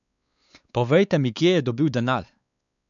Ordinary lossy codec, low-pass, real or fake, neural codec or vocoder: none; 7.2 kHz; fake; codec, 16 kHz, 4 kbps, X-Codec, WavLM features, trained on Multilingual LibriSpeech